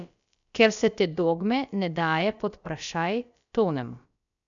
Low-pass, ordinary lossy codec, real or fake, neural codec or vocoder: 7.2 kHz; none; fake; codec, 16 kHz, about 1 kbps, DyCAST, with the encoder's durations